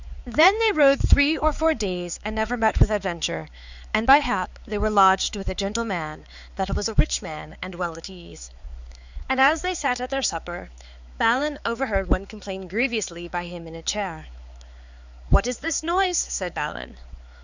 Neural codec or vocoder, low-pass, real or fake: codec, 16 kHz, 4 kbps, X-Codec, HuBERT features, trained on balanced general audio; 7.2 kHz; fake